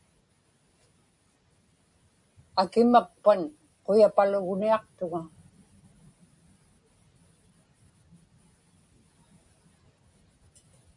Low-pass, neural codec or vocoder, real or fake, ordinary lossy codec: 10.8 kHz; none; real; MP3, 64 kbps